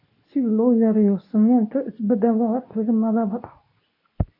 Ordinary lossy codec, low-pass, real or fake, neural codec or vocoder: MP3, 32 kbps; 5.4 kHz; fake; codec, 24 kHz, 0.9 kbps, WavTokenizer, medium speech release version 2